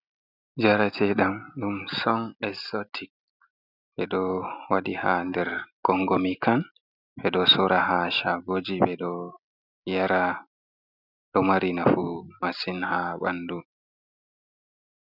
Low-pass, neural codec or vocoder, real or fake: 5.4 kHz; none; real